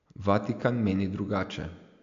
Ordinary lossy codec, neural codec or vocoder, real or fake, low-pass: AAC, 64 kbps; none; real; 7.2 kHz